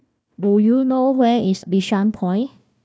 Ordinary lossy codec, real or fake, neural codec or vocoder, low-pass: none; fake; codec, 16 kHz, 1 kbps, FunCodec, trained on Chinese and English, 50 frames a second; none